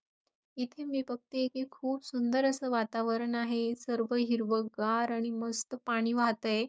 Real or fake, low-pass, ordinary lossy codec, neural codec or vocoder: fake; none; none; codec, 16 kHz, 6 kbps, DAC